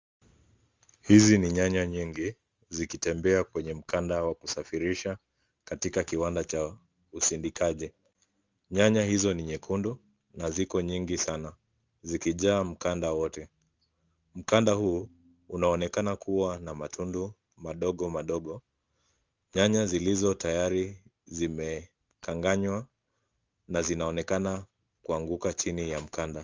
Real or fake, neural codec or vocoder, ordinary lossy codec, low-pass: real; none; Opus, 32 kbps; 7.2 kHz